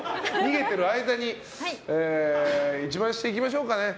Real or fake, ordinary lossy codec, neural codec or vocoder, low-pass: real; none; none; none